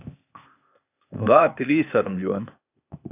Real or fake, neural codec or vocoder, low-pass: fake; codec, 16 kHz, 0.8 kbps, ZipCodec; 3.6 kHz